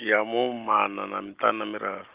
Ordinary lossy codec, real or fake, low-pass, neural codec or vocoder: Opus, 32 kbps; real; 3.6 kHz; none